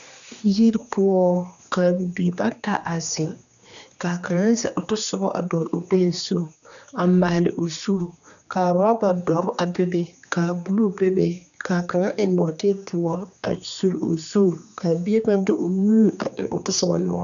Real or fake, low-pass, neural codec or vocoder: fake; 7.2 kHz; codec, 16 kHz, 2 kbps, X-Codec, HuBERT features, trained on general audio